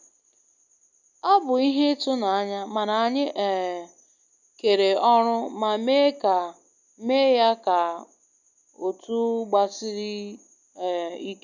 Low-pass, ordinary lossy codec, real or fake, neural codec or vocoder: 7.2 kHz; none; real; none